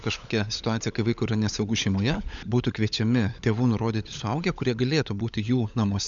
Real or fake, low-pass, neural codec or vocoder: fake; 7.2 kHz; codec, 16 kHz, 16 kbps, FunCodec, trained on LibriTTS, 50 frames a second